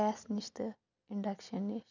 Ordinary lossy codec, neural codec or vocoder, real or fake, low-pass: none; none; real; 7.2 kHz